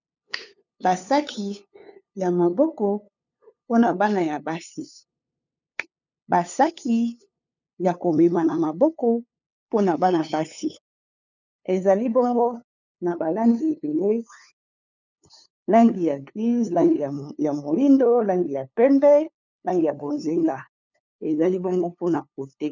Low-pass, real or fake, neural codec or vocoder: 7.2 kHz; fake; codec, 16 kHz, 2 kbps, FunCodec, trained on LibriTTS, 25 frames a second